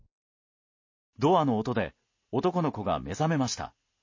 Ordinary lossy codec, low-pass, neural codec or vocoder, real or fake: MP3, 32 kbps; 7.2 kHz; none; real